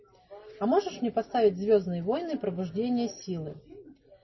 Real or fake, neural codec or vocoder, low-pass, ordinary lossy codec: real; none; 7.2 kHz; MP3, 24 kbps